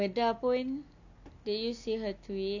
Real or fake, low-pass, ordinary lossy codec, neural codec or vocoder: real; 7.2 kHz; none; none